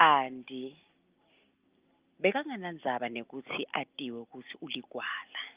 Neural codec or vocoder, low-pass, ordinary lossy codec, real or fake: none; 3.6 kHz; Opus, 32 kbps; real